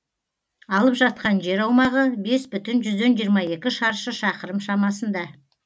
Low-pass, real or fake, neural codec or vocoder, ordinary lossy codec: none; real; none; none